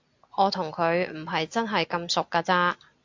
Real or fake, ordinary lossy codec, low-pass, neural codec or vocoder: real; AAC, 48 kbps; 7.2 kHz; none